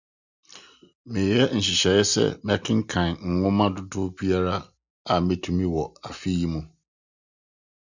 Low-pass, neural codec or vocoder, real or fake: 7.2 kHz; none; real